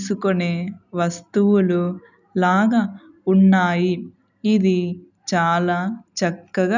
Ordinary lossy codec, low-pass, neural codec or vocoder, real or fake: none; 7.2 kHz; none; real